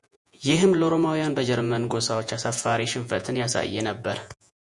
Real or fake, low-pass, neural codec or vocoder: fake; 10.8 kHz; vocoder, 48 kHz, 128 mel bands, Vocos